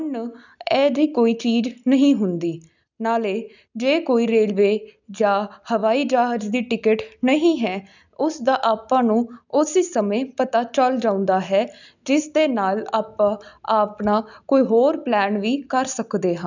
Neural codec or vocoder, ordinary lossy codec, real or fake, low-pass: none; none; real; 7.2 kHz